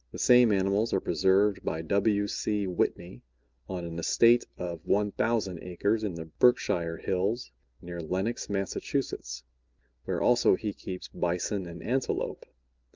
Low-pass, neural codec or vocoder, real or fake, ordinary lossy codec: 7.2 kHz; none; real; Opus, 24 kbps